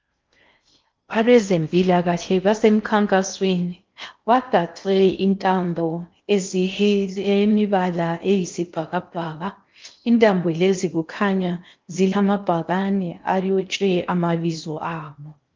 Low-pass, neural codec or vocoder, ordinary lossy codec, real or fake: 7.2 kHz; codec, 16 kHz in and 24 kHz out, 0.6 kbps, FocalCodec, streaming, 4096 codes; Opus, 24 kbps; fake